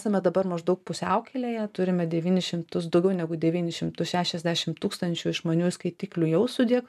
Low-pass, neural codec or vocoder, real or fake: 14.4 kHz; none; real